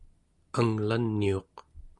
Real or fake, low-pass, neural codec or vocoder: real; 10.8 kHz; none